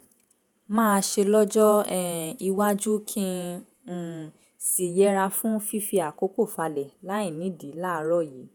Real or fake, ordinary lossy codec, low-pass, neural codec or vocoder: fake; none; none; vocoder, 48 kHz, 128 mel bands, Vocos